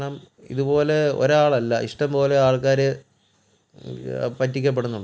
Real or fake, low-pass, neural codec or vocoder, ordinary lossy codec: real; none; none; none